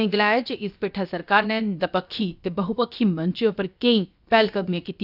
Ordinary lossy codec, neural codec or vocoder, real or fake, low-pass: none; codec, 16 kHz, about 1 kbps, DyCAST, with the encoder's durations; fake; 5.4 kHz